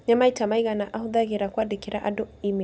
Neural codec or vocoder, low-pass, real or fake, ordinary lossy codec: none; none; real; none